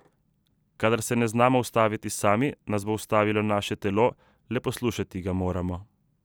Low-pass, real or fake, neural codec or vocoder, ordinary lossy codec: none; real; none; none